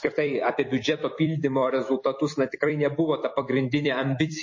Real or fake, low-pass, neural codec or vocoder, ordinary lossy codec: fake; 7.2 kHz; vocoder, 44.1 kHz, 128 mel bands every 256 samples, BigVGAN v2; MP3, 32 kbps